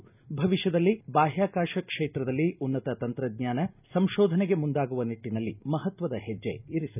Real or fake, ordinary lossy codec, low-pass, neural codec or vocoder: real; none; 3.6 kHz; none